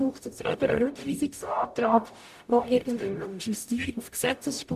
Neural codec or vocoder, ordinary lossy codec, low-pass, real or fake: codec, 44.1 kHz, 0.9 kbps, DAC; AAC, 96 kbps; 14.4 kHz; fake